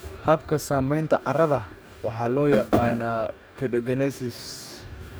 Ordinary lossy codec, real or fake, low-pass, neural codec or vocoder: none; fake; none; codec, 44.1 kHz, 2.6 kbps, DAC